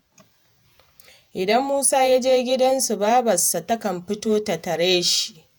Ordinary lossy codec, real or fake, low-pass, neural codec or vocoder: none; fake; none; vocoder, 48 kHz, 128 mel bands, Vocos